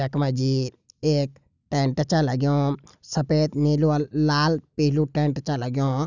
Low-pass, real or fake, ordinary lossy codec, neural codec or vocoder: 7.2 kHz; real; none; none